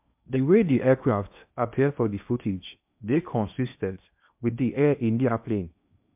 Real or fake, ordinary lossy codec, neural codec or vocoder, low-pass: fake; MP3, 32 kbps; codec, 16 kHz in and 24 kHz out, 0.8 kbps, FocalCodec, streaming, 65536 codes; 3.6 kHz